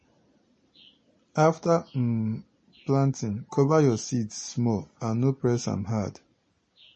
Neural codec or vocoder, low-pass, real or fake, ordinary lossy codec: none; 10.8 kHz; real; MP3, 32 kbps